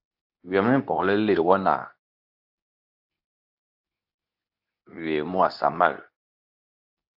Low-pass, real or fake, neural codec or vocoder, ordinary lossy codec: 5.4 kHz; fake; codec, 24 kHz, 0.9 kbps, WavTokenizer, medium speech release version 2; AAC, 48 kbps